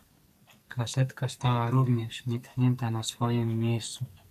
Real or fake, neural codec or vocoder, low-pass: fake; codec, 44.1 kHz, 2.6 kbps, SNAC; 14.4 kHz